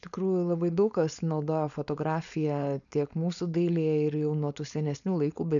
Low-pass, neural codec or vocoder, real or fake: 7.2 kHz; codec, 16 kHz, 4.8 kbps, FACodec; fake